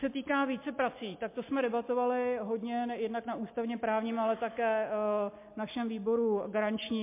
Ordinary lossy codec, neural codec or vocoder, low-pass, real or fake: MP3, 24 kbps; none; 3.6 kHz; real